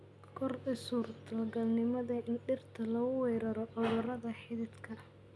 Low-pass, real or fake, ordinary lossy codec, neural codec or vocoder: none; real; none; none